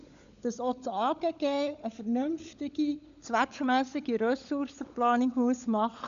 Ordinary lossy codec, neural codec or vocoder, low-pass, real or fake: none; codec, 16 kHz, 16 kbps, FunCodec, trained on LibriTTS, 50 frames a second; 7.2 kHz; fake